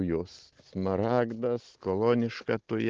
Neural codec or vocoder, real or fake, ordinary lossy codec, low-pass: none; real; Opus, 16 kbps; 7.2 kHz